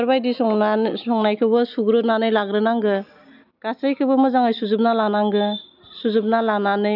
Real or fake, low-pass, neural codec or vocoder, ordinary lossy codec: real; 5.4 kHz; none; none